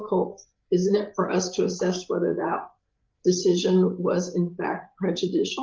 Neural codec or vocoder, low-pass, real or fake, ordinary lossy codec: codec, 16 kHz, 8 kbps, FreqCodec, larger model; 7.2 kHz; fake; Opus, 32 kbps